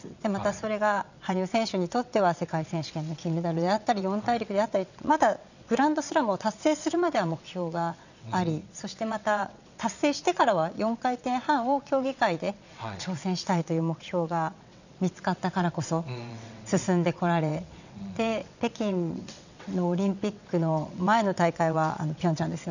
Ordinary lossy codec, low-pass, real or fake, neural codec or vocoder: none; 7.2 kHz; fake; vocoder, 22.05 kHz, 80 mel bands, WaveNeXt